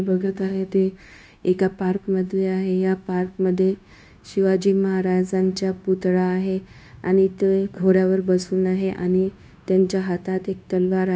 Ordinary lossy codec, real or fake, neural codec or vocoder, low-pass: none; fake; codec, 16 kHz, 0.9 kbps, LongCat-Audio-Codec; none